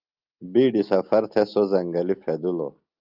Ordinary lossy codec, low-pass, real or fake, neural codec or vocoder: Opus, 24 kbps; 5.4 kHz; real; none